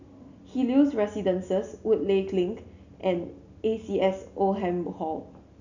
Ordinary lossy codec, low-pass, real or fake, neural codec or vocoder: none; 7.2 kHz; real; none